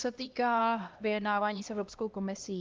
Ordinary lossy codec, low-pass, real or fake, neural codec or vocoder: Opus, 24 kbps; 7.2 kHz; fake; codec, 16 kHz, 1 kbps, X-Codec, HuBERT features, trained on LibriSpeech